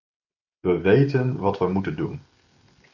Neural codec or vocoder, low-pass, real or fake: none; 7.2 kHz; real